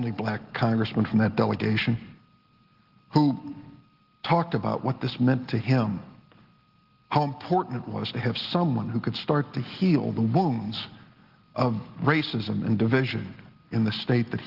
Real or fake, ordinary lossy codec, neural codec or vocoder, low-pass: real; Opus, 16 kbps; none; 5.4 kHz